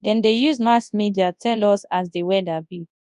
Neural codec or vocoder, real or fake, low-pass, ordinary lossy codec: codec, 24 kHz, 0.9 kbps, WavTokenizer, large speech release; fake; 10.8 kHz; none